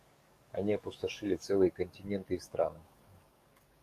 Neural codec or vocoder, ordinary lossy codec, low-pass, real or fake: codec, 44.1 kHz, 7.8 kbps, DAC; Opus, 64 kbps; 14.4 kHz; fake